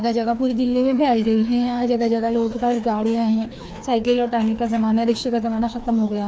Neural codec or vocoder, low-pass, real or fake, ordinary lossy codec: codec, 16 kHz, 2 kbps, FreqCodec, larger model; none; fake; none